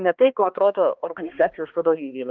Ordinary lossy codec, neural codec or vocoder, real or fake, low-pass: Opus, 32 kbps; codec, 16 kHz, 2 kbps, X-Codec, HuBERT features, trained on balanced general audio; fake; 7.2 kHz